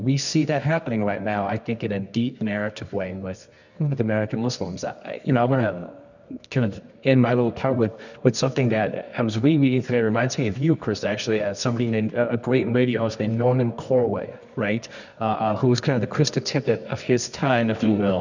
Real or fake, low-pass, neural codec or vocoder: fake; 7.2 kHz; codec, 24 kHz, 0.9 kbps, WavTokenizer, medium music audio release